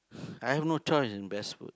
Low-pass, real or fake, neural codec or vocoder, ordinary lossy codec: none; real; none; none